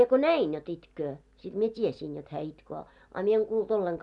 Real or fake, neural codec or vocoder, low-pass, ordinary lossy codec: real; none; 10.8 kHz; none